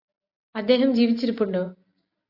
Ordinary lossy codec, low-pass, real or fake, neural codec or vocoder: AAC, 32 kbps; 5.4 kHz; real; none